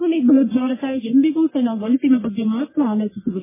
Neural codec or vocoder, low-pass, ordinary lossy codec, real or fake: codec, 44.1 kHz, 1.7 kbps, Pupu-Codec; 3.6 kHz; MP3, 16 kbps; fake